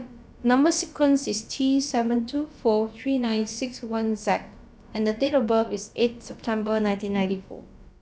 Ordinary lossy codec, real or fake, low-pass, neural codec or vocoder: none; fake; none; codec, 16 kHz, about 1 kbps, DyCAST, with the encoder's durations